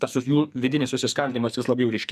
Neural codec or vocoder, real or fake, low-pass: codec, 44.1 kHz, 2.6 kbps, SNAC; fake; 14.4 kHz